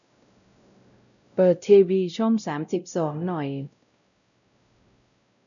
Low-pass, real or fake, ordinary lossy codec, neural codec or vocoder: 7.2 kHz; fake; none; codec, 16 kHz, 0.5 kbps, X-Codec, WavLM features, trained on Multilingual LibriSpeech